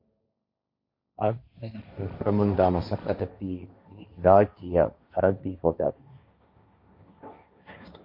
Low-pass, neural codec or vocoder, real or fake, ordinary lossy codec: 5.4 kHz; codec, 16 kHz, 1.1 kbps, Voila-Tokenizer; fake; MP3, 32 kbps